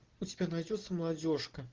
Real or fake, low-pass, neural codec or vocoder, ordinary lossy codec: real; 7.2 kHz; none; Opus, 16 kbps